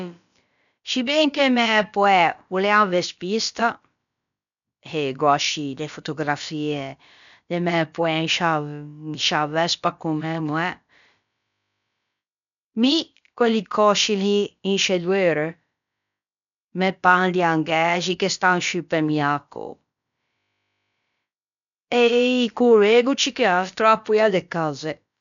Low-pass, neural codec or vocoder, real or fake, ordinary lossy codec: 7.2 kHz; codec, 16 kHz, about 1 kbps, DyCAST, with the encoder's durations; fake; none